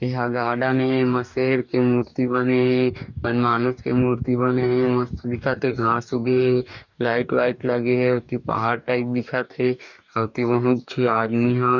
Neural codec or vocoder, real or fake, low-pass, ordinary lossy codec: codec, 44.1 kHz, 2.6 kbps, DAC; fake; 7.2 kHz; none